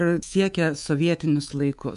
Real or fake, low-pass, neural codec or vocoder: fake; 10.8 kHz; codec, 24 kHz, 3.1 kbps, DualCodec